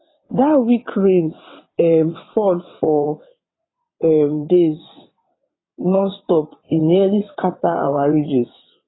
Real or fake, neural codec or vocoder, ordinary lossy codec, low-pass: fake; vocoder, 22.05 kHz, 80 mel bands, WaveNeXt; AAC, 16 kbps; 7.2 kHz